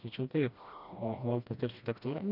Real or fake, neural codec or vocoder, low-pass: fake; codec, 16 kHz, 1 kbps, FreqCodec, smaller model; 5.4 kHz